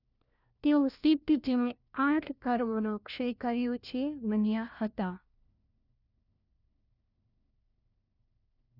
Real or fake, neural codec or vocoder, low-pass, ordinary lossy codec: fake; codec, 16 kHz, 1 kbps, FunCodec, trained on LibriTTS, 50 frames a second; 5.4 kHz; none